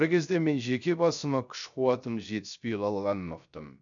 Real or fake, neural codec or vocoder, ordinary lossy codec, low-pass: fake; codec, 16 kHz, 0.3 kbps, FocalCodec; none; 7.2 kHz